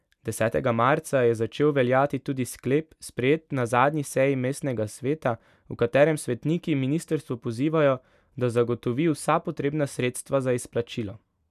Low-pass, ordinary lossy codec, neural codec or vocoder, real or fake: 14.4 kHz; none; none; real